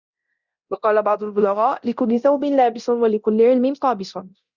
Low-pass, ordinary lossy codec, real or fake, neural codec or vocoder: 7.2 kHz; Opus, 64 kbps; fake; codec, 24 kHz, 0.9 kbps, DualCodec